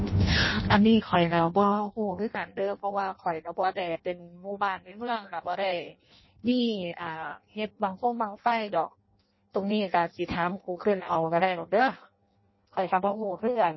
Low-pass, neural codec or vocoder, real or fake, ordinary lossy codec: 7.2 kHz; codec, 16 kHz in and 24 kHz out, 0.6 kbps, FireRedTTS-2 codec; fake; MP3, 24 kbps